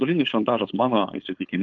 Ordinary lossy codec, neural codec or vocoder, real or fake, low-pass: Opus, 32 kbps; codec, 16 kHz, 4.8 kbps, FACodec; fake; 7.2 kHz